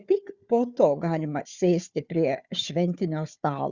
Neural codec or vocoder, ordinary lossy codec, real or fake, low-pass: codec, 16 kHz, 4 kbps, FunCodec, trained on Chinese and English, 50 frames a second; Opus, 64 kbps; fake; 7.2 kHz